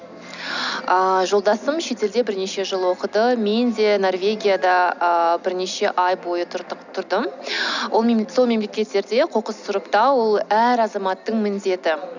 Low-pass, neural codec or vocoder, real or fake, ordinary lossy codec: 7.2 kHz; none; real; none